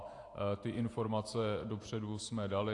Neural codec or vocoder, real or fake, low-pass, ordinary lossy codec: vocoder, 48 kHz, 128 mel bands, Vocos; fake; 10.8 kHz; AAC, 48 kbps